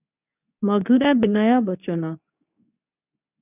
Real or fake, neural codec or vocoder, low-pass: fake; codec, 24 kHz, 0.9 kbps, WavTokenizer, medium speech release version 2; 3.6 kHz